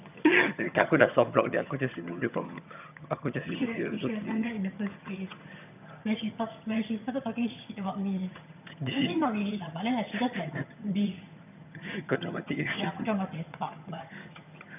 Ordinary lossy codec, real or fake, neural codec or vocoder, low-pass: none; fake; vocoder, 22.05 kHz, 80 mel bands, HiFi-GAN; 3.6 kHz